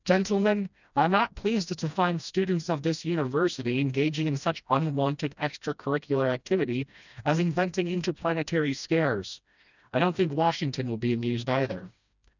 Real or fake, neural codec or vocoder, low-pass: fake; codec, 16 kHz, 1 kbps, FreqCodec, smaller model; 7.2 kHz